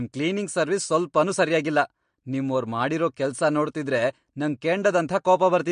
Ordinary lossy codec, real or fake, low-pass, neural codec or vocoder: MP3, 48 kbps; real; 9.9 kHz; none